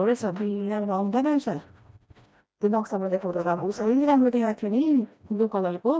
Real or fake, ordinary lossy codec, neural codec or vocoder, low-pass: fake; none; codec, 16 kHz, 1 kbps, FreqCodec, smaller model; none